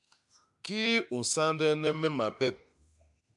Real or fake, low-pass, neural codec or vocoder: fake; 10.8 kHz; autoencoder, 48 kHz, 32 numbers a frame, DAC-VAE, trained on Japanese speech